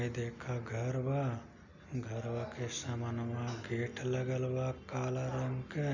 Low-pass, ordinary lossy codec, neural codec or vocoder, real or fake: 7.2 kHz; none; none; real